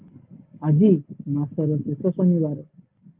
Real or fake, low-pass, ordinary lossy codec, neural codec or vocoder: real; 3.6 kHz; Opus, 32 kbps; none